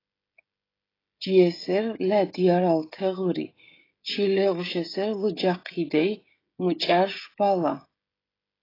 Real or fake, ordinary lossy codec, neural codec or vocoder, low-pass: fake; AAC, 24 kbps; codec, 16 kHz, 16 kbps, FreqCodec, smaller model; 5.4 kHz